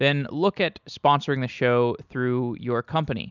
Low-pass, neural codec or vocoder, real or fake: 7.2 kHz; none; real